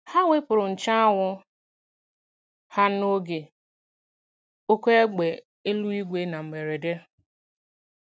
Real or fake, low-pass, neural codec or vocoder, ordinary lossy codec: real; none; none; none